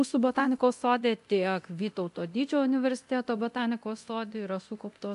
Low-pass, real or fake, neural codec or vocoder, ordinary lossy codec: 10.8 kHz; fake; codec, 24 kHz, 0.9 kbps, DualCodec; AAC, 64 kbps